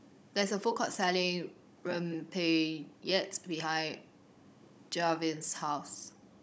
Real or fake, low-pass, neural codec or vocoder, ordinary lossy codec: fake; none; codec, 16 kHz, 16 kbps, FunCodec, trained on Chinese and English, 50 frames a second; none